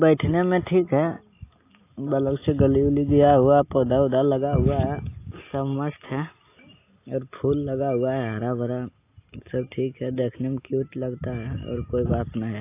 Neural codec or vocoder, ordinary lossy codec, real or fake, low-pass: none; AAC, 24 kbps; real; 3.6 kHz